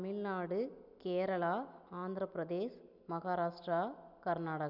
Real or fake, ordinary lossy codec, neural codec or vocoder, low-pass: real; none; none; 5.4 kHz